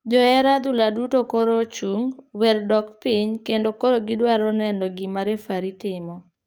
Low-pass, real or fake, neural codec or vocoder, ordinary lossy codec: none; fake; codec, 44.1 kHz, 7.8 kbps, DAC; none